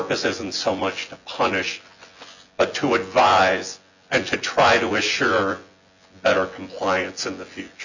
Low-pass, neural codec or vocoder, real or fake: 7.2 kHz; vocoder, 24 kHz, 100 mel bands, Vocos; fake